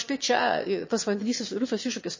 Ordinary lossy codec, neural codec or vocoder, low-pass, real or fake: MP3, 32 kbps; autoencoder, 22.05 kHz, a latent of 192 numbers a frame, VITS, trained on one speaker; 7.2 kHz; fake